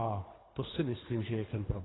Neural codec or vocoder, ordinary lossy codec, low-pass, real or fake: codec, 24 kHz, 3 kbps, HILCodec; AAC, 16 kbps; 7.2 kHz; fake